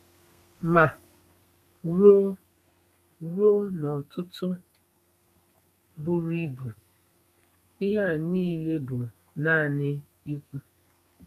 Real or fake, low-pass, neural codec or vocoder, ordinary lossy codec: fake; 14.4 kHz; codec, 32 kHz, 1.9 kbps, SNAC; none